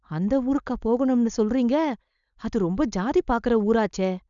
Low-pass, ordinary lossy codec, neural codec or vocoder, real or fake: 7.2 kHz; none; codec, 16 kHz, 4.8 kbps, FACodec; fake